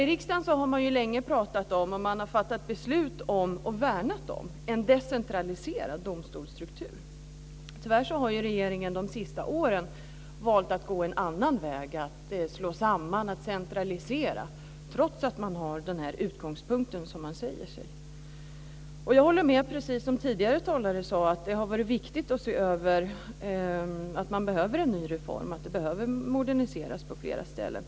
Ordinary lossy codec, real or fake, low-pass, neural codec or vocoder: none; real; none; none